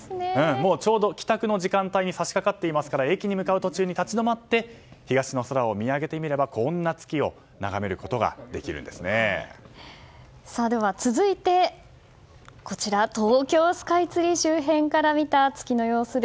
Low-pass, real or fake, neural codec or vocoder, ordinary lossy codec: none; real; none; none